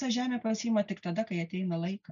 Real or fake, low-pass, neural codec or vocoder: real; 7.2 kHz; none